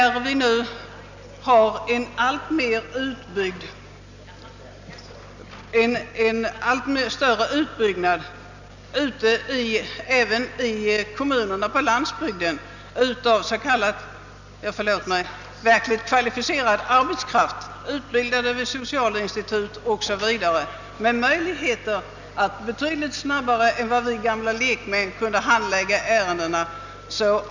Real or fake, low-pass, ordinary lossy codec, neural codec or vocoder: real; 7.2 kHz; none; none